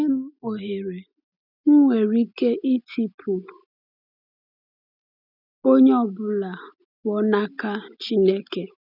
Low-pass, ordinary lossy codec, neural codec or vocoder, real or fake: 5.4 kHz; none; none; real